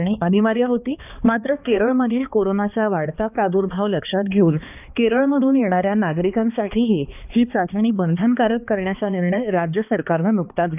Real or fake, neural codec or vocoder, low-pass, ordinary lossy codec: fake; codec, 16 kHz, 2 kbps, X-Codec, HuBERT features, trained on balanced general audio; 3.6 kHz; none